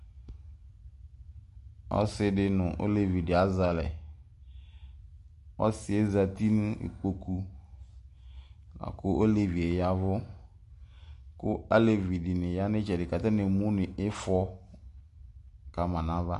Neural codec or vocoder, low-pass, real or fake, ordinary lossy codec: none; 10.8 kHz; real; MP3, 64 kbps